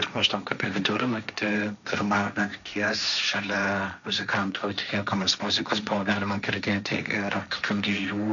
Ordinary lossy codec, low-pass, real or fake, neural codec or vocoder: MP3, 96 kbps; 7.2 kHz; fake; codec, 16 kHz, 1.1 kbps, Voila-Tokenizer